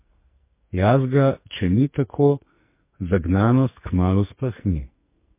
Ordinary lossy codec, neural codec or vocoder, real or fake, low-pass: MP3, 24 kbps; codec, 44.1 kHz, 2.6 kbps, SNAC; fake; 3.6 kHz